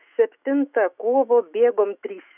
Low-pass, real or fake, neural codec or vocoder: 3.6 kHz; fake; autoencoder, 48 kHz, 128 numbers a frame, DAC-VAE, trained on Japanese speech